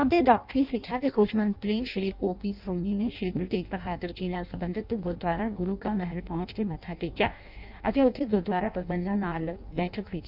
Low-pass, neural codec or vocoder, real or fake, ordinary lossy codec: 5.4 kHz; codec, 16 kHz in and 24 kHz out, 0.6 kbps, FireRedTTS-2 codec; fake; none